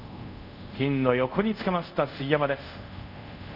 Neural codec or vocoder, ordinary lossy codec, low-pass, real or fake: codec, 24 kHz, 0.5 kbps, DualCodec; none; 5.4 kHz; fake